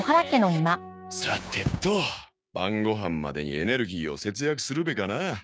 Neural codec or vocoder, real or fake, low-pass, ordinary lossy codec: codec, 16 kHz, 6 kbps, DAC; fake; none; none